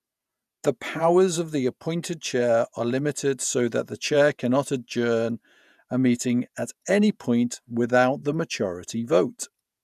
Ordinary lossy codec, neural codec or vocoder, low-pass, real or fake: none; vocoder, 48 kHz, 128 mel bands, Vocos; 14.4 kHz; fake